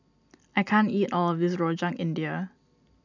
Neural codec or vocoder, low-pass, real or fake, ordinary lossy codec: none; 7.2 kHz; real; none